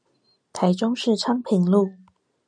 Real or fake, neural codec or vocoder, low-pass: real; none; 9.9 kHz